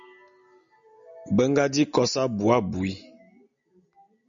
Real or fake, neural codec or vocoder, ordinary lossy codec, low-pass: real; none; MP3, 64 kbps; 7.2 kHz